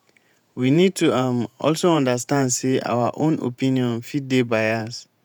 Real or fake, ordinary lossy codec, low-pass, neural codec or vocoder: fake; none; none; vocoder, 48 kHz, 128 mel bands, Vocos